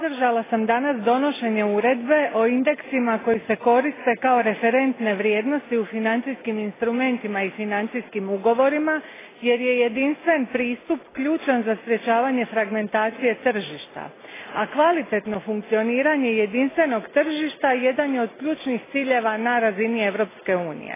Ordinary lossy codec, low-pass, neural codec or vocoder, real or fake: AAC, 16 kbps; 3.6 kHz; none; real